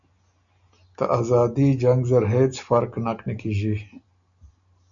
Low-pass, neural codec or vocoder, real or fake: 7.2 kHz; none; real